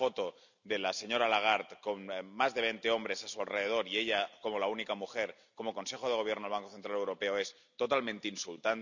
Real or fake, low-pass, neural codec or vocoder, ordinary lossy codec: real; 7.2 kHz; none; none